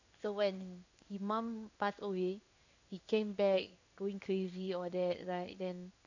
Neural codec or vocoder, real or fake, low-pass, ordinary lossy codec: codec, 16 kHz in and 24 kHz out, 1 kbps, XY-Tokenizer; fake; 7.2 kHz; none